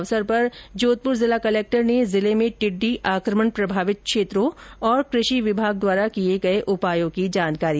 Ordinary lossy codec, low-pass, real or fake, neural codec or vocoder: none; none; real; none